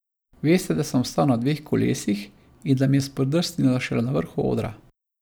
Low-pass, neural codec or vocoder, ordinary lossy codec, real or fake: none; none; none; real